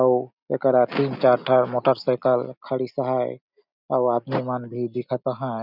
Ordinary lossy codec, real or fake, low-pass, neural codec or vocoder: none; real; 5.4 kHz; none